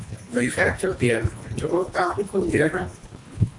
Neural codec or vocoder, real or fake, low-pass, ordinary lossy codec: codec, 24 kHz, 1.5 kbps, HILCodec; fake; 10.8 kHz; AAC, 64 kbps